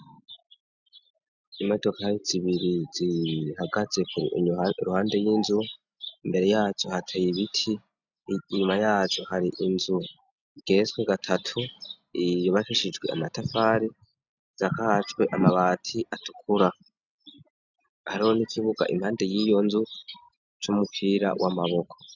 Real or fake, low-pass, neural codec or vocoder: real; 7.2 kHz; none